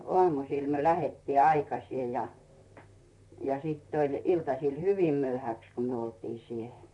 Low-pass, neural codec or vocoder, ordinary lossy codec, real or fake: 10.8 kHz; vocoder, 44.1 kHz, 128 mel bands, Pupu-Vocoder; none; fake